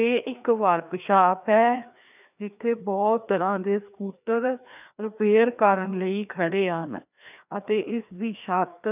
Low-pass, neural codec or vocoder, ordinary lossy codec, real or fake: 3.6 kHz; codec, 16 kHz, 2 kbps, FreqCodec, larger model; none; fake